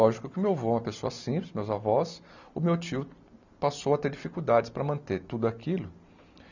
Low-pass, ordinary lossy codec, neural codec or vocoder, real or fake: 7.2 kHz; none; none; real